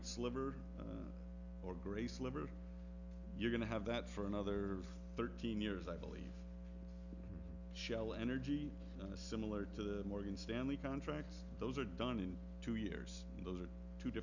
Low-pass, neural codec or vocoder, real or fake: 7.2 kHz; none; real